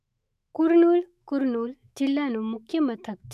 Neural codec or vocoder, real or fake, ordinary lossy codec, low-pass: autoencoder, 48 kHz, 128 numbers a frame, DAC-VAE, trained on Japanese speech; fake; none; 14.4 kHz